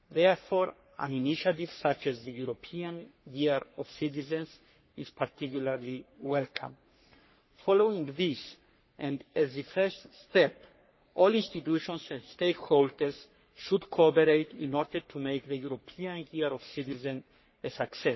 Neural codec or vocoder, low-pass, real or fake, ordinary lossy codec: codec, 44.1 kHz, 3.4 kbps, Pupu-Codec; 7.2 kHz; fake; MP3, 24 kbps